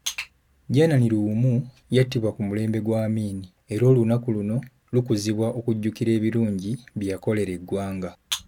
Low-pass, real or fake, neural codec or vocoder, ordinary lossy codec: 19.8 kHz; real; none; none